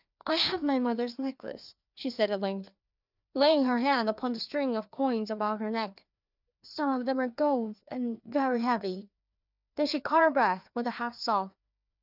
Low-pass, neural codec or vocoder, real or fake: 5.4 kHz; codec, 16 kHz, 2 kbps, FreqCodec, larger model; fake